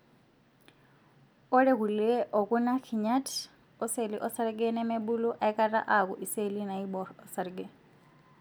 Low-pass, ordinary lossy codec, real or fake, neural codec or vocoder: none; none; real; none